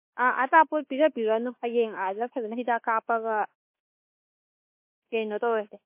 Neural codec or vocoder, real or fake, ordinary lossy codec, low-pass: codec, 24 kHz, 1.2 kbps, DualCodec; fake; MP3, 24 kbps; 3.6 kHz